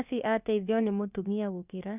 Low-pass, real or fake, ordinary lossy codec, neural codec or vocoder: 3.6 kHz; fake; none; codec, 16 kHz, about 1 kbps, DyCAST, with the encoder's durations